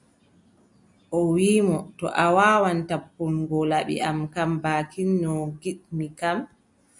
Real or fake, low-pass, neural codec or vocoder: real; 10.8 kHz; none